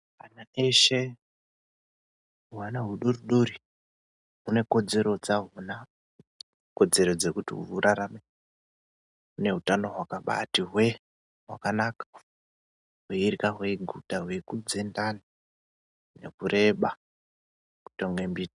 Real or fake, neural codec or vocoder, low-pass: real; none; 10.8 kHz